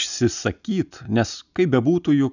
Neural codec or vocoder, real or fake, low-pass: none; real; 7.2 kHz